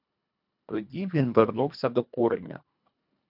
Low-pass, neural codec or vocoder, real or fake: 5.4 kHz; codec, 24 kHz, 1.5 kbps, HILCodec; fake